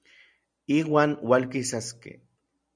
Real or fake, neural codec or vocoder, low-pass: real; none; 9.9 kHz